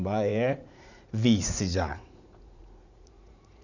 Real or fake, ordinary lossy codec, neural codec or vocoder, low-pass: fake; none; vocoder, 44.1 kHz, 80 mel bands, Vocos; 7.2 kHz